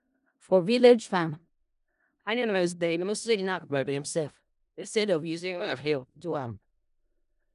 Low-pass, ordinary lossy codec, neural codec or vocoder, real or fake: 10.8 kHz; none; codec, 16 kHz in and 24 kHz out, 0.4 kbps, LongCat-Audio-Codec, four codebook decoder; fake